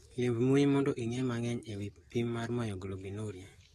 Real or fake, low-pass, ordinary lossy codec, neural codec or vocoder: fake; 19.8 kHz; AAC, 32 kbps; codec, 44.1 kHz, 7.8 kbps, DAC